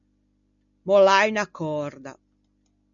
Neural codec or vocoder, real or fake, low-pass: none; real; 7.2 kHz